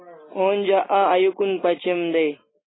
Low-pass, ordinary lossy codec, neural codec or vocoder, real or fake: 7.2 kHz; AAC, 16 kbps; none; real